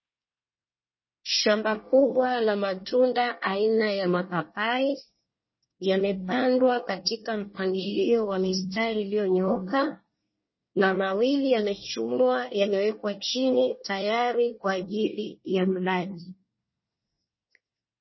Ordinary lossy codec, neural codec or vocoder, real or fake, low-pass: MP3, 24 kbps; codec, 24 kHz, 1 kbps, SNAC; fake; 7.2 kHz